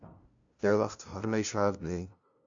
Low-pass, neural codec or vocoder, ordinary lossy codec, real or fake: 7.2 kHz; codec, 16 kHz, 0.5 kbps, FunCodec, trained on LibriTTS, 25 frames a second; AAC, 64 kbps; fake